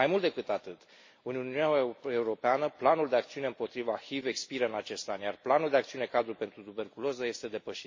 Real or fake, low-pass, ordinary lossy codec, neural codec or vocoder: real; 7.2 kHz; MP3, 32 kbps; none